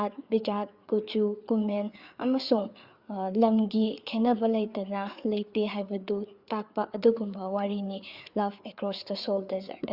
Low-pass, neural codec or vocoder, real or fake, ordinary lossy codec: 5.4 kHz; codec, 16 kHz, 4 kbps, FreqCodec, larger model; fake; Opus, 64 kbps